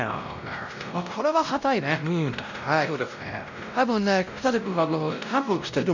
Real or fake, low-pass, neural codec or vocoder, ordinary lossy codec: fake; 7.2 kHz; codec, 16 kHz, 0.5 kbps, X-Codec, WavLM features, trained on Multilingual LibriSpeech; none